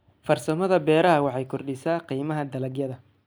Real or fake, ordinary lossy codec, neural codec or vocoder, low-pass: real; none; none; none